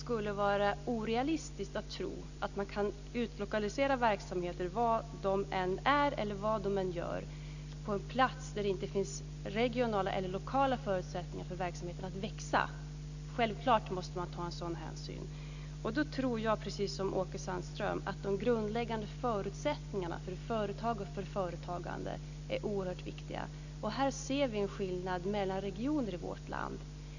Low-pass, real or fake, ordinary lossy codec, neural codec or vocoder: 7.2 kHz; real; none; none